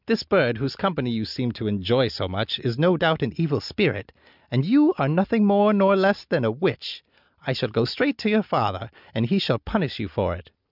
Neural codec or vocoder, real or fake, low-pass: none; real; 5.4 kHz